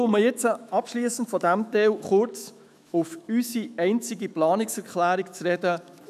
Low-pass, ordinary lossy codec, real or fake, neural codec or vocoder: 14.4 kHz; none; fake; autoencoder, 48 kHz, 128 numbers a frame, DAC-VAE, trained on Japanese speech